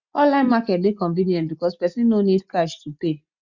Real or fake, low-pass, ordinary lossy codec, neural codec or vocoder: real; 7.2 kHz; Opus, 64 kbps; none